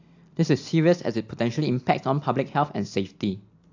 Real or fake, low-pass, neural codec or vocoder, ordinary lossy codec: real; 7.2 kHz; none; AAC, 48 kbps